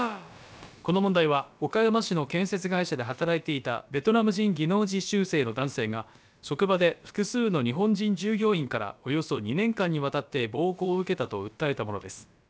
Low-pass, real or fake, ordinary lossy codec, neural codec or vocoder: none; fake; none; codec, 16 kHz, about 1 kbps, DyCAST, with the encoder's durations